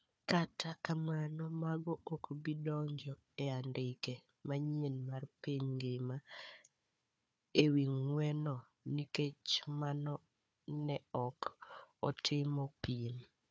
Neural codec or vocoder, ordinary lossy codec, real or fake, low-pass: codec, 16 kHz, 4 kbps, FunCodec, trained on Chinese and English, 50 frames a second; none; fake; none